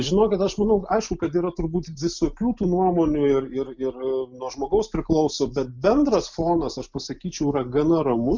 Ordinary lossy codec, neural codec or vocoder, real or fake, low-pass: MP3, 64 kbps; none; real; 7.2 kHz